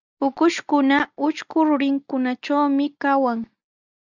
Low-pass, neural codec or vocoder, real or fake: 7.2 kHz; none; real